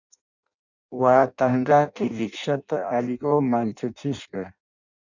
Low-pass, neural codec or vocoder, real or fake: 7.2 kHz; codec, 16 kHz in and 24 kHz out, 0.6 kbps, FireRedTTS-2 codec; fake